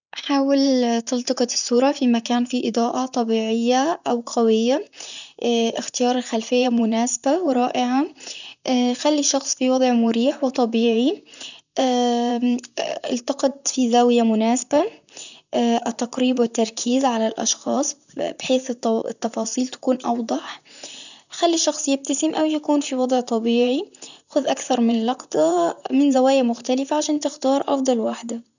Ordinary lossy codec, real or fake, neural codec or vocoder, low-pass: none; fake; vocoder, 44.1 kHz, 128 mel bands, Pupu-Vocoder; 7.2 kHz